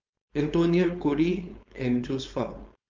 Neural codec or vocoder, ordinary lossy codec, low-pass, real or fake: codec, 16 kHz, 4.8 kbps, FACodec; Opus, 32 kbps; 7.2 kHz; fake